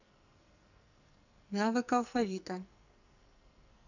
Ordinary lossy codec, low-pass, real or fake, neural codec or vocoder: none; 7.2 kHz; fake; codec, 44.1 kHz, 2.6 kbps, SNAC